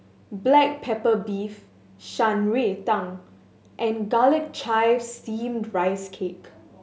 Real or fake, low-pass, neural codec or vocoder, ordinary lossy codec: real; none; none; none